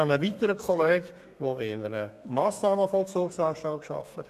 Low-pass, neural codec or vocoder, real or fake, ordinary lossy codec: 14.4 kHz; codec, 32 kHz, 1.9 kbps, SNAC; fake; none